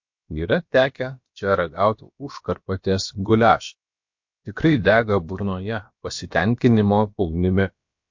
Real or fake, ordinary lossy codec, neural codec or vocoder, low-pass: fake; MP3, 48 kbps; codec, 16 kHz, about 1 kbps, DyCAST, with the encoder's durations; 7.2 kHz